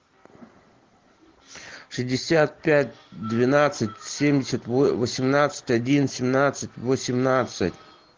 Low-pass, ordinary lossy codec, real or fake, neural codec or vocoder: 7.2 kHz; Opus, 16 kbps; real; none